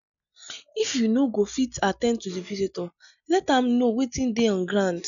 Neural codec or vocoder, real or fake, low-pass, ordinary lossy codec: none; real; 7.2 kHz; none